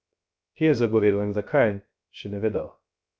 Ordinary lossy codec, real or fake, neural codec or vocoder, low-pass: none; fake; codec, 16 kHz, 0.3 kbps, FocalCodec; none